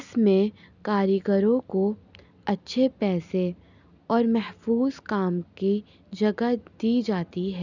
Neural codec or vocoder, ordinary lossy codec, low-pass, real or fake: none; none; 7.2 kHz; real